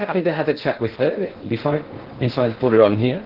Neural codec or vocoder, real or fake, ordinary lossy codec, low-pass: codec, 16 kHz in and 24 kHz out, 0.6 kbps, FocalCodec, streaming, 2048 codes; fake; Opus, 16 kbps; 5.4 kHz